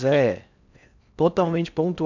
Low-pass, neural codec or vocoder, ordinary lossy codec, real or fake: 7.2 kHz; codec, 16 kHz in and 24 kHz out, 0.6 kbps, FocalCodec, streaming, 4096 codes; none; fake